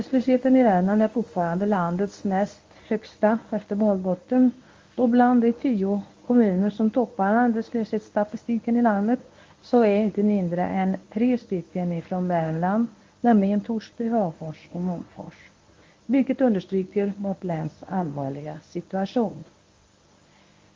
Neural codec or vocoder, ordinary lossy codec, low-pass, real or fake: codec, 24 kHz, 0.9 kbps, WavTokenizer, medium speech release version 1; Opus, 32 kbps; 7.2 kHz; fake